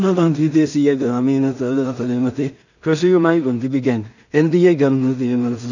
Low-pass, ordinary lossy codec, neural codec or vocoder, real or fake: 7.2 kHz; none; codec, 16 kHz in and 24 kHz out, 0.4 kbps, LongCat-Audio-Codec, two codebook decoder; fake